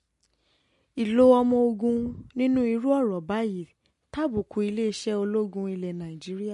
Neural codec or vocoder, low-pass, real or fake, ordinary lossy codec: none; 14.4 kHz; real; MP3, 48 kbps